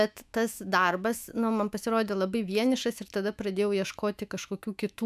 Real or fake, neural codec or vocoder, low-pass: fake; autoencoder, 48 kHz, 128 numbers a frame, DAC-VAE, trained on Japanese speech; 14.4 kHz